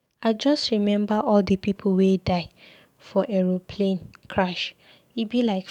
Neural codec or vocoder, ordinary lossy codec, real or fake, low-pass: codec, 44.1 kHz, 7.8 kbps, DAC; none; fake; 19.8 kHz